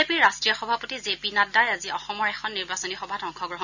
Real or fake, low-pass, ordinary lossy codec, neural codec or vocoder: real; 7.2 kHz; none; none